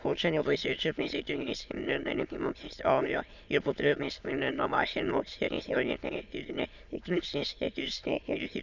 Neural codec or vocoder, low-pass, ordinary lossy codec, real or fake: autoencoder, 22.05 kHz, a latent of 192 numbers a frame, VITS, trained on many speakers; 7.2 kHz; none; fake